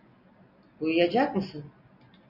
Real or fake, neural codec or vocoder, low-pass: real; none; 5.4 kHz